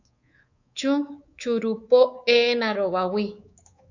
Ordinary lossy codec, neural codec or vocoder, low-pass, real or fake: AAC, 48 kbps; codec, 24 kHz, 3.1 kbps, DualCodec; 7.2 kHz; fake